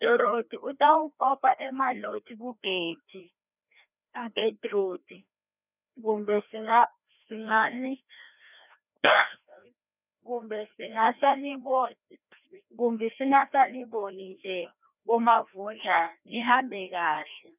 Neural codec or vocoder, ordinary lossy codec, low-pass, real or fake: codec, 16 kHz, 1 kbps, FreqCodec, larger model; none; 3.6 kHz; fake